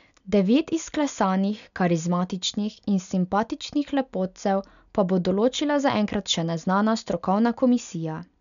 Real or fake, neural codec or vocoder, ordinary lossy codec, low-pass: real; none; none; 7.2 kHz